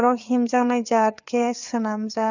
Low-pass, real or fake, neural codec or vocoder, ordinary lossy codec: 7.2 kHz; fake; codec, 16 kHz, 4 kbps, FreqCodec, larger model; none